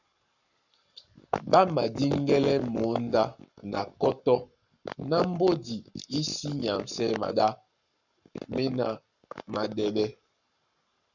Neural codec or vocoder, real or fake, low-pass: codec, 44.1 kHz, 7.8 kbps, Pupu-Codec; fake; 7.2 kHz